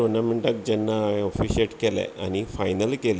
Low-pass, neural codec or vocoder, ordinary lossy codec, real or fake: none; none; none; real